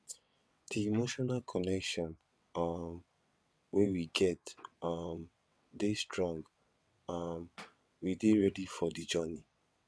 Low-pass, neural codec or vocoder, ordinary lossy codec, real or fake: none; vocoder, 22.05 kHz, 80 mel bands, WaveNeXt; none; fake